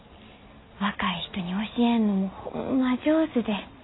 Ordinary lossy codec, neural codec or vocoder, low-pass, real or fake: AAC, 16 kbps; none; 7.2 kHz; real